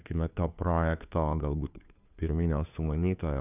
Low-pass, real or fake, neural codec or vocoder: 3.6 kHz; fake; codec, 16 kHz, 2 kbps, FunCodec, trained on Chinese and English, 25 frames a second